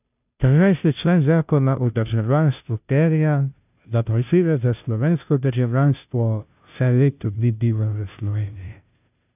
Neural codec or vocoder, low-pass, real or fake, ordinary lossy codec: codec, 16 kHz, 0.5 kbps, FunCodec, trained on Chinese and English, 25 frames a second; 3.6 kHz; fake; none